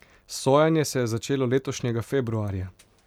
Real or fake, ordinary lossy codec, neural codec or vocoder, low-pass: real; none; none; 19.8 kHz